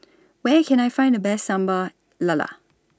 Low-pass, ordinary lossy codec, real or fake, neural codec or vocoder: none; none; real; none